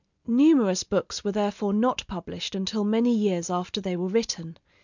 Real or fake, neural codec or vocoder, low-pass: real; none; 7.2 kHz